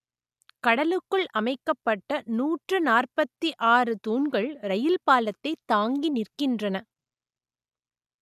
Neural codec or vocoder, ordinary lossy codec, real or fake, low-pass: none; none; real; 14.4 kHz